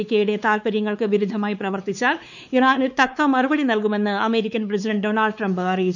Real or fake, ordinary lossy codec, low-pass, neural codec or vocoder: fake; none; 7.2 kHz; codec, 16 kHz, 4 kbps, X-Codec, WavLM features, trained on Multilingual LibriSpeech